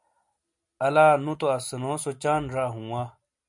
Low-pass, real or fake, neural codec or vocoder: 10.8 kHz; real; none